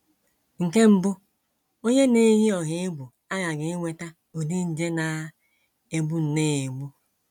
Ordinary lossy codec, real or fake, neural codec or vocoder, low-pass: none; real; none; 19.8 kHz